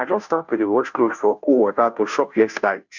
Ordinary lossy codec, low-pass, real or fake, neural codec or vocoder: none; 7.2 kHz; fake; codec, 16 kHz, 0.5 kbps, FunCodec, trained on Chinese and English, 25 frames a second